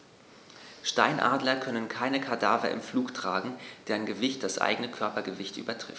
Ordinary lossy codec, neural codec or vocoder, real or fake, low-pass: none; none; real; none